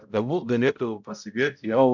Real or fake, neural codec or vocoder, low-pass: fake; codec, 16 kHz, 0.5 kbps, X-Codec, HuBERT features, trained on balanced general audio; 7.2 kHz